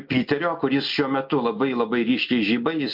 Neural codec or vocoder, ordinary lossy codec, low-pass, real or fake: none; MP3, 48 kbps; 5.4 kHz; real